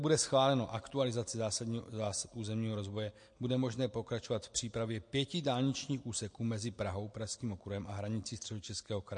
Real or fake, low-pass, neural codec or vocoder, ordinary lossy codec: real; 9.9 kHz; none; MP3, 48 kbps